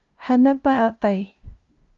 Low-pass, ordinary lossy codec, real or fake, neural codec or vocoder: 7.2 kHz; Opus, 24 kbps; fake; codec, 16 kHz, 0.5 kbps, FunCodec, trained on LibriTTS, 25 frames a second